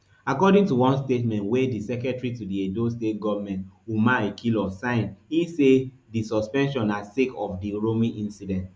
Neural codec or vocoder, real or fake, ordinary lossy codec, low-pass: none; real; none; none